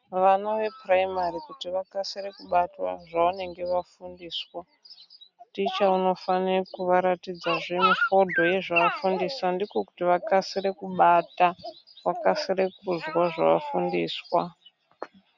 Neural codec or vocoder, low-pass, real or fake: none; 7.2 kHz; real